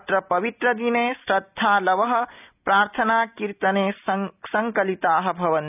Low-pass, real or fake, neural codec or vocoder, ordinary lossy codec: 3.6 kHz; real; none; none